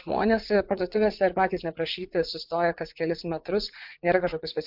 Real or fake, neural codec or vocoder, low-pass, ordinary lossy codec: fake; vocoder, 44.1 kHz, 80 mel bands, Vocos; 5.4 kHz; MP3, 48 kbps